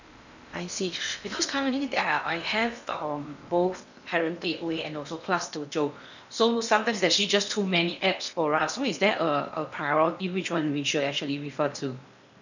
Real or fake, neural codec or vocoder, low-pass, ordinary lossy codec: fake; codec, 16 kHz in and 24 kHz out, 0.8 kbps, FocalCodec, streaming, 65536 codes; 7.2 kHz; none